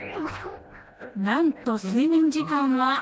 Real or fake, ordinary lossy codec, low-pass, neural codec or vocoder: fake; none; none; codec, 16 kHz, 1 kbps, FreqCodec, smaller model